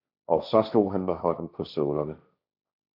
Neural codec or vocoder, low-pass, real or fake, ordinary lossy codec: codec, 16 kHz, 1.1 kbps, Voila-Tokenizer; 5.4 kHz; fake; AAC, 48 kbps